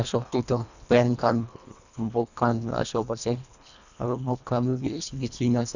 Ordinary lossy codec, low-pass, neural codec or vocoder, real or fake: none; 7.2 kHz; codec, 24 kHz, 1.5 kbps, HILCodec; fake